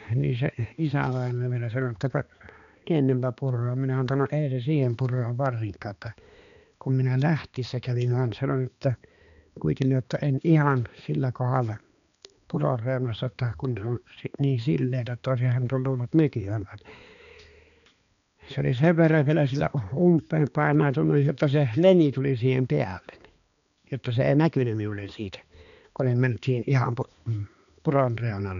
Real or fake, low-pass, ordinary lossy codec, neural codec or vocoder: fake; 7.2 kHz; none; codec, 16 kHz, 2 kbps, X-Codec, HuBERT features, trained on balanced general audio